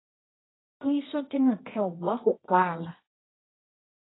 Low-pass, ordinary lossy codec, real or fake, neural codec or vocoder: 7.2 kHz; AAC, 16 kbps; fake; codec, 24 kHz, 0.9 kbps, WavTokenizer, medium music audio release